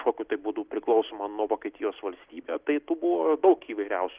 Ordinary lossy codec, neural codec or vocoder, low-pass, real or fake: Opus, 16 kbps; none; 3.6 kHz; real